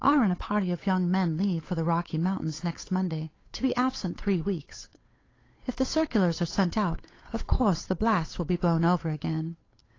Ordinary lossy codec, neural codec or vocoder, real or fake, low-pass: AAC, 32 kbps; codec, 16 kHz, 8 kbps, FunCodec, trained on Chinese and English, 25 frames a second; fake; 7.2 kHz